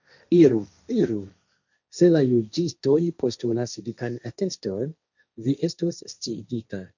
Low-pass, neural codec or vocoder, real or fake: 7.2 kHz; codec, 16 kHz, 1.1 kbps, Voila-Tokenizer; fake